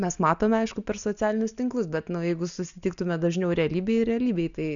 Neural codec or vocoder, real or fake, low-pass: none; real; 7.2 kHz